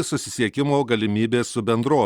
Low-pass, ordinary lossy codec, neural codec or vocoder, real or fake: 19.8 kHz; Opus, 64 kbps; none; real